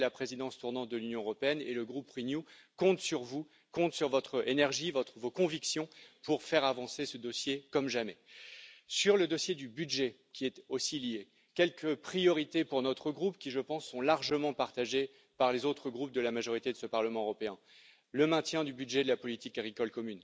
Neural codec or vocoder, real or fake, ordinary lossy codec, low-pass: none; real; none; none